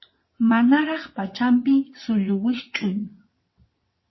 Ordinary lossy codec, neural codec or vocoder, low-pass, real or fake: MP3, 24 kbps; vocoder, 22.05 kHz, 80 mel bands, WaveNeXt; 7.2 kHz; fake